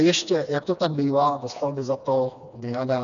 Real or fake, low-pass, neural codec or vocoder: fake; 7.2 kHz; codec, 16 kHz, 2 kbps, FreqCodec, smaller model